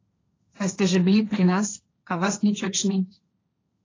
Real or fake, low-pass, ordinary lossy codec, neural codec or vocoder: fake; 7.2 kHz; AAC, 32 kbps; codec, 16 kHz, 1.1 kbps, Voila-Tokenizer